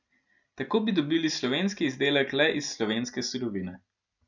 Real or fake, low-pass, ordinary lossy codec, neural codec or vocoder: real; 7.2 kHz; none; none